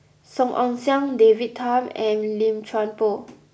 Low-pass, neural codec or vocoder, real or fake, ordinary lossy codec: none; none; real; none